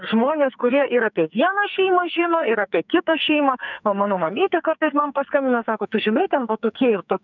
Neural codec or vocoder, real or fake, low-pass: codec, 44.1 kHz, 2.6 kbps, SNAC; fake; 7.2 kHz